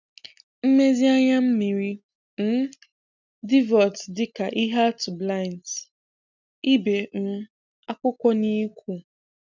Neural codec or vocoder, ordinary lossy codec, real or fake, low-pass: none; none; real; 7.2 kHz